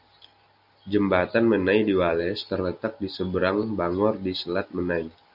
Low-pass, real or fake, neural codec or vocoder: 5.4 kHz; real; none